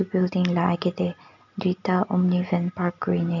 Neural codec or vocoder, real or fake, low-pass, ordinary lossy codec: none; real; 7.2 kHz; none